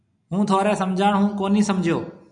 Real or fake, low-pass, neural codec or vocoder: real; 9.9 kHz; none